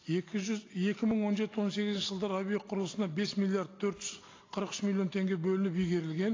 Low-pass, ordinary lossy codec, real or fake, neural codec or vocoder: 7.2 kHz; AAC, 32 kbps; real; none